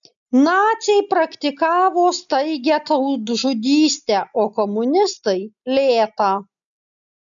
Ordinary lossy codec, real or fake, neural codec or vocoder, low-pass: MP3, 96 kbps; real; none; 7.2 kHz